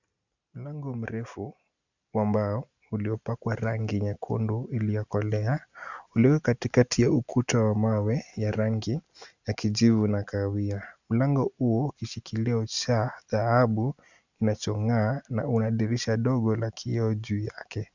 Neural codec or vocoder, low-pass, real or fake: none; 7.2 kHz; real